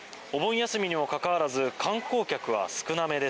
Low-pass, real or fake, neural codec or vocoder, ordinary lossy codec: none; real; none; none